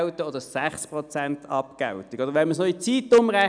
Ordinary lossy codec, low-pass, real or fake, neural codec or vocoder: none; 9.9 kHz; real; none